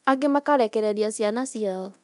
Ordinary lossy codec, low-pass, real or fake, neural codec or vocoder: none; 10.8 kHz; fake; codec, 24 kHz, 0.9 kbps, DualCodec